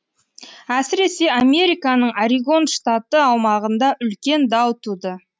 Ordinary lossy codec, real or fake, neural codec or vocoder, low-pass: none; real; none; none